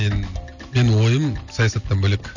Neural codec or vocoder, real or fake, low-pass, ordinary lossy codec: none; real; 7.2 kHz; none